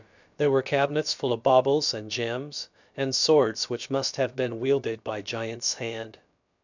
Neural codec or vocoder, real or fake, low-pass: codec, 16 kHz, about 1 kbps, DyCAST, with the encoder's durations; fake; 7.2 kHz